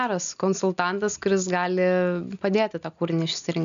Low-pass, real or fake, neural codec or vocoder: 7.2 kHz; real; none